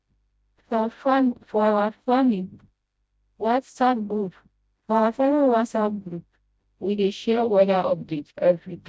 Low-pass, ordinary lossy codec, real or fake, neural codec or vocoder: none; none; fake; codec, 16 kHz, 0.5 kbps, FreqCodec, smaller model